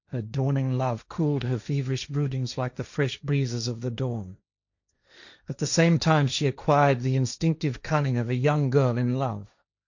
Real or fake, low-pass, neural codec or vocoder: fake; 7.2 kHz; codec, 16 kHz, 1.1 kbps, Voila-Tokenizer